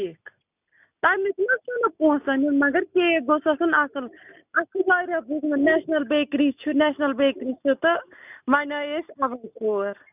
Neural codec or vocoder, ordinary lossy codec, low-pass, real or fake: none; none; 3.6 kHz; real